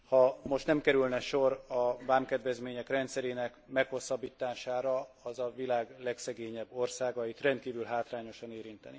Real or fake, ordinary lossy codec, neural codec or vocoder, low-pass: real; none; none; none